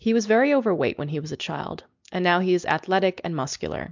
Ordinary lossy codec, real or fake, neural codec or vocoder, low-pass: MP3, 64 kbps; real; none; 7.2 kHz